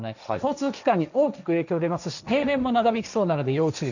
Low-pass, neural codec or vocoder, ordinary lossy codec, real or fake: 7.2 kHz; codec, 16 kHz, 1.1 kbps, Voila-Tokenizer; none; fake